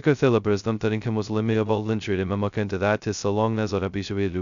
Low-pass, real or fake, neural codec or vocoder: 7.2 kHz; fake; codec, 16 kHz, 0.2 kbps, FocalCodec